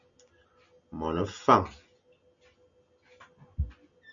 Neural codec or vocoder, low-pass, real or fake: none; 7.2 kHz; real